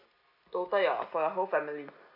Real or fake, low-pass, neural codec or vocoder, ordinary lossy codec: real; 5.4 kHz; none; none